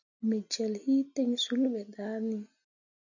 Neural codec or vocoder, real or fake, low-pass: none; real; 7.2 kHz